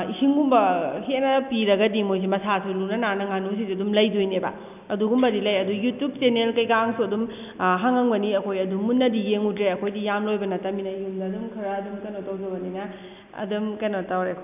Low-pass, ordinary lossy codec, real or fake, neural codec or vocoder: 3.6 kHz; none; real; none